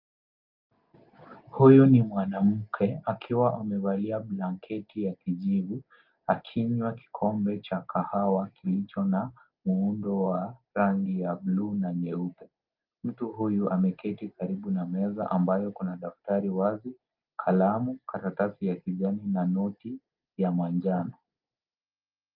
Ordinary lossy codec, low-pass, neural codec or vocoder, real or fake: Opus, 32 kbps; 5.4 kHz; none; real